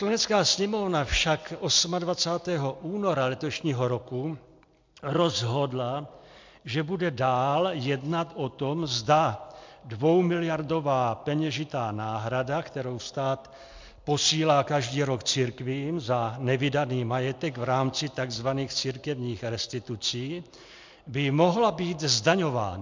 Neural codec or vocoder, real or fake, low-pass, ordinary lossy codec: none; real; 7.2 kHz; MP3, 64 kbps